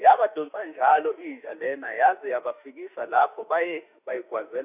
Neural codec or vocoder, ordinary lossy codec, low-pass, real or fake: autoencoder, 48 kHz, 32 numbers a frame, DAC-VAE, trained on Japanese speech; none; 3.6 kHz; fake